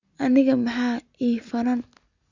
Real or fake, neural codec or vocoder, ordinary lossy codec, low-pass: real; none; Opus, 64 kbps; 7.2 kHz